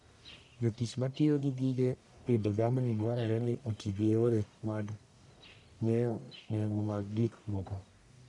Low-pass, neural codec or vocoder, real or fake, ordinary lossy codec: 10.8 kHz; codec, 44.1 kHz, 1.7 kbps, Pupu-Codec; fake; none